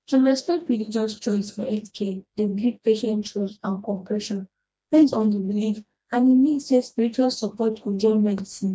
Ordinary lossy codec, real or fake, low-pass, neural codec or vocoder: none; fake; none; codec, 16 kHz, 1 kbps, FreqCodec, smaller model